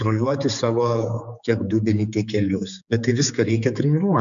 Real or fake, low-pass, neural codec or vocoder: fake; 7.2 kHz; codec, 16 kHz, 4 kbps, X-Codec, HuBERT features, trained on general audio